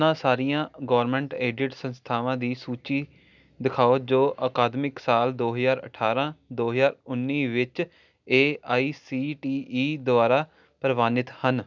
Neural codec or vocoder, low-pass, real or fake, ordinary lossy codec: none; 7.2 kHz; real; none